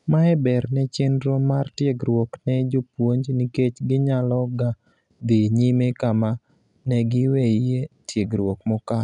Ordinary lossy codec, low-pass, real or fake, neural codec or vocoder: none; 10.8 kHz; real; none